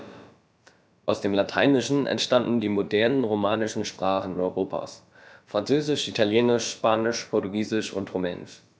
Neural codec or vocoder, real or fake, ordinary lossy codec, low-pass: codec, 16 kHz, about 1 kbps, DyCAST, with the encoder's durations; fake; none; none